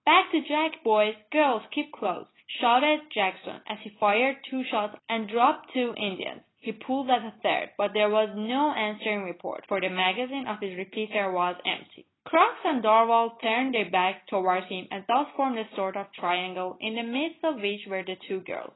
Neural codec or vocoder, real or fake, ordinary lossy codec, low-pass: none; real; AAC, 16 kbps; 7.2 kHz